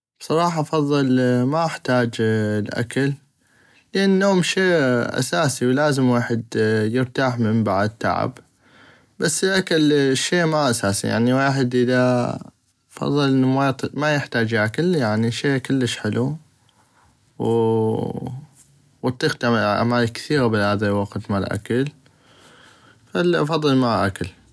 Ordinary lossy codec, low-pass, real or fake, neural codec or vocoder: none; none; real; none